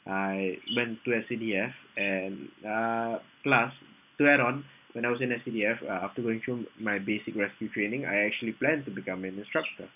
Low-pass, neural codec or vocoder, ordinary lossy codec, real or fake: 3.6 kHz; none; none; real